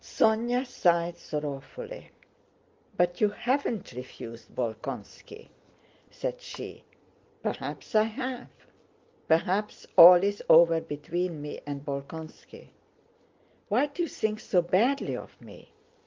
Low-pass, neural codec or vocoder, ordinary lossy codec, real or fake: 7.2 kHz; none; Opus, 24 kbps; real